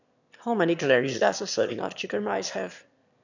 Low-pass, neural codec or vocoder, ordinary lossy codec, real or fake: 7.2 kHz; autoencoder, 22.05 kHz, a latent of 192 numbers a frame, VITS, trained on one speaker; none; fake